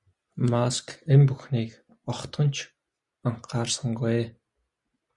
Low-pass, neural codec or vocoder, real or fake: 10.8 kHz; none; real